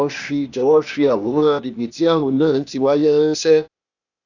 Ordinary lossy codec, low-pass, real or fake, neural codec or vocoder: none; 7.2 kHz; fake; codec, 16 kHz, 0.8 kbps, ZipCodec